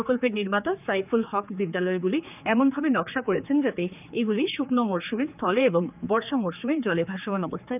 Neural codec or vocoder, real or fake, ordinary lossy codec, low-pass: codec, 16 kHz, 4 kbps, X-Codec, HuBERT features, trained on general audio; fake; none; 3.6 kHz